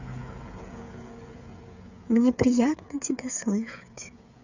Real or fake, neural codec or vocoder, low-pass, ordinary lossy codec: fake; codec, 16 kHz, 8 kbps, FreqCodec, smaller model; 7.2 kHz; none